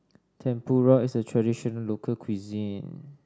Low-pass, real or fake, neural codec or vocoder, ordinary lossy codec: none; real; none; none